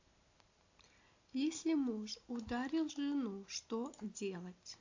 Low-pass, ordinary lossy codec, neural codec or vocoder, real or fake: 7.2 kHz; AAC, 48 kbps; none; real